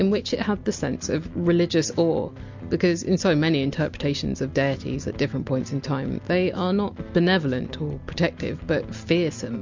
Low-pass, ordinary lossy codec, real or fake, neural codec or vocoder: 7.2 kHz; MP3, 64 kbps; real; none